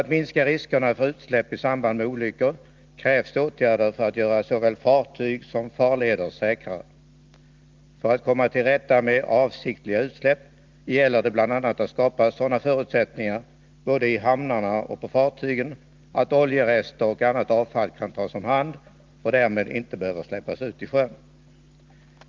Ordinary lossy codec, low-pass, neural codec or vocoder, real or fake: Opus, 24 kbps; 7.2 kHz; none; real